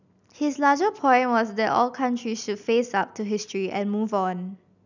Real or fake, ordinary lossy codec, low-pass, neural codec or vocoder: real; none; 7.2 kHz; none